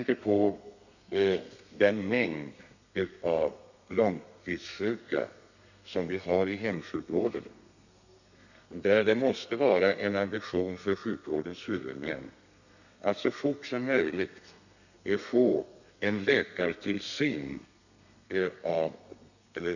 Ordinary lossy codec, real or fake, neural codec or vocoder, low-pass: none; fake; codec, 32 kHz, 1.9 kbps, SNAC; 7.2 kHz